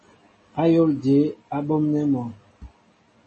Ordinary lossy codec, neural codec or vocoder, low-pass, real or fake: MP3, 32 kbps; none; 10.8 kHz; real